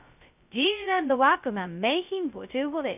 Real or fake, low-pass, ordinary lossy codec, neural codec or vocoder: fake; 3.6 kHz; none; codec, 16 kHz, 0.2 kbps, FocalCodec